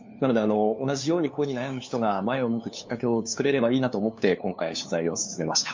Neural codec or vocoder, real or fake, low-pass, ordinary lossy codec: codec, 16 kHz, 2 kbps, FunCodec, trained on LibriTTS, 25 frames a second; fake; 7.2 kHz; MP3, 48 kbps